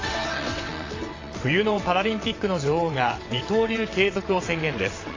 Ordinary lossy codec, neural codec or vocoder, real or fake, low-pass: AAC, 32 kbps; vocoder, 22.05 kHz, 80 mel bands, WaveNeXt; fake; 7.2 kHz